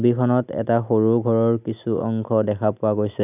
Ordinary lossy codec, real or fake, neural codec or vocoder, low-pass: none; real; none; 3.6 kHz